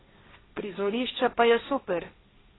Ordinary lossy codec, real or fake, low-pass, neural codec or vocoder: AAC, 16 kbps; fake; 7.2 kHz; codec, 16 kHz, 1.1 kbps, Voila-Tokenizer